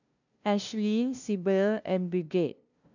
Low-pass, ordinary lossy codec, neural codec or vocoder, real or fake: 7.2 kHz; none; codec, 16 kHz, 0.5 kbps, FunCodec, trained on LibriTTS, 25 frames a second; fake